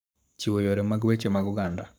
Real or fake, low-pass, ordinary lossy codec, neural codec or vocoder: fake; none; none; codec, 44.1 kHz, 7.8 kbps, Pupu-Codec